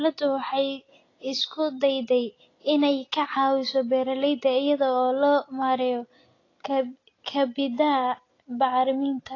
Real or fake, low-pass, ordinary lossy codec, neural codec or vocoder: real; 7.2 kHz; AAC, 32 kbps; none